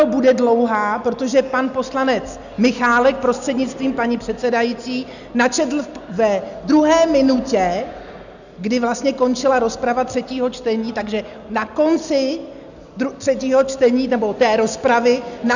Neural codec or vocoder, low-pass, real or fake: none; 7.2 kHz; real